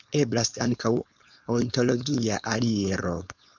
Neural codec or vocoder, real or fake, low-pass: codec, 16 kHz, 4.8 kbps, FACodec; fake; 7.2 kHz